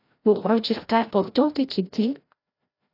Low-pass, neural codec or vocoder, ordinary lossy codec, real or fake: 5.4 kHz; codec, 16 kHz, 0.5 kbps, FreqCodec, larger model; AAC, 32 kbps; fake